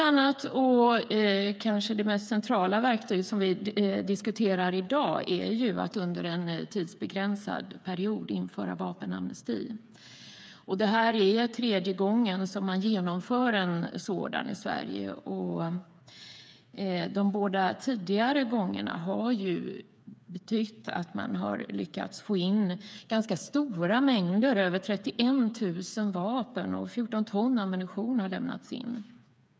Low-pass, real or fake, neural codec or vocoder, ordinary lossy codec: none; fake; codec, 16 kHz, 8 kbps, FreqCodec, smaller model; none